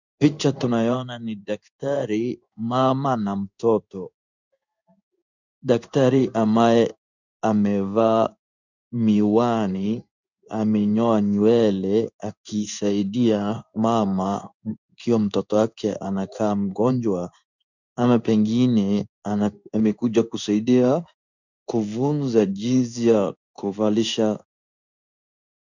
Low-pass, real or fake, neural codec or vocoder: 7.2 kHz; fake; codec, 16 kHz in and 24 kHz out, 1 kbps, XY-Tokenizer